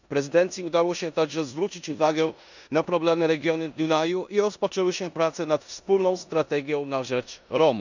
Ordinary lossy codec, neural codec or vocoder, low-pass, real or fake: none; codec, 16 kHz in and 24 kHz out, 0.9 kbps, LongCat-Audio-Codec, four codebook decoder; 7.2 kHz; fake